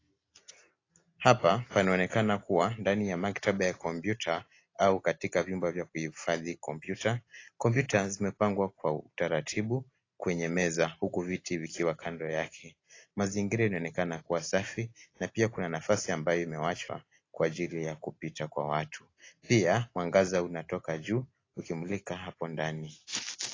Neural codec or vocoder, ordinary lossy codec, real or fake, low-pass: none; AAC, 32 kbps; real; 7.2 kHz